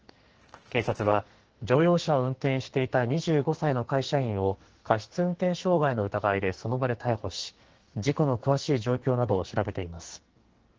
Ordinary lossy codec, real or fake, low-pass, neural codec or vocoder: Opus, 16 kbps; fake; 7.2 kHz; codec, 44.1 kHz, 2.6 kbps, SNAC